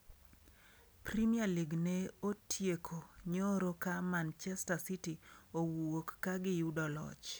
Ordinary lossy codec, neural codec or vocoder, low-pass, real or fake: none; none; none; real